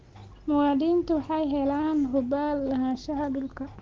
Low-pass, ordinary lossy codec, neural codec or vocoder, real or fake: 7.2 kHz; Opus, 16 kbps; none; real